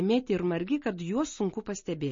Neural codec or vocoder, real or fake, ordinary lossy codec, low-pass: none; real; MP3, 32 kbps; 7.2 kHz